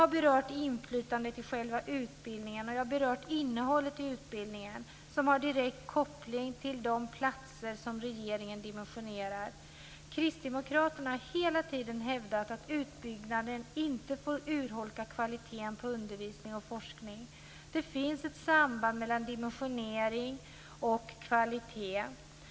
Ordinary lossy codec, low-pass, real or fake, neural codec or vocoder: none; none; real; none